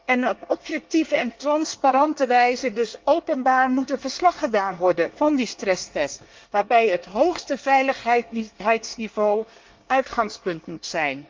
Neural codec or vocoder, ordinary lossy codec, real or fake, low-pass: codec, 24 kHz, 1 kbps, SNAC; Opus, 32 kbps; fake; 7.2 kHz